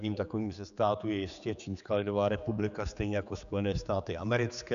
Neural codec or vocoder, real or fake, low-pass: codec, 16 kHz, 4 kbps, X-Codec, HuBERT features, trained on general audio; fake; 7.2 kHz